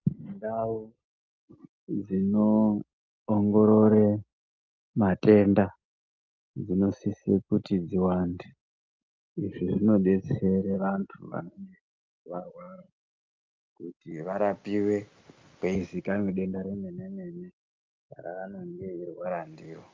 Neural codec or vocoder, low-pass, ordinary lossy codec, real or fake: none; 7.2 kHz; Opus, 24 kbps; real